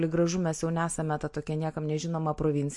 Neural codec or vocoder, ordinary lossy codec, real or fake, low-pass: none; MP3, 48 kbps; real; 10.8 kHz